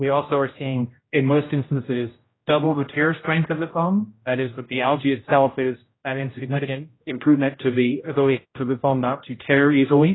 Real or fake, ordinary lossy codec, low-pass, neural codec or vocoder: fake; AAC, 16 kbps; 7.2 kHz; codec, 16 kHz, 0.5 kbps, X-Codec, HuBERT features, trained on general audio